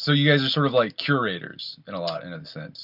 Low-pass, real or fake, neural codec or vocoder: 5.4 kHz; real; none